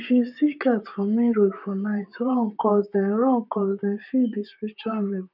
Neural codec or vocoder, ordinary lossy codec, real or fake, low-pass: vocoder, 44.1 kHz, 128 mel bands, Pupu-Vocoder; none; fake; 5.4 kHz